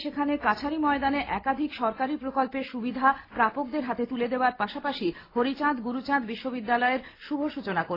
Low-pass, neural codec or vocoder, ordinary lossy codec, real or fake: 5.4 kHz; none; AAC, 24 kbps; real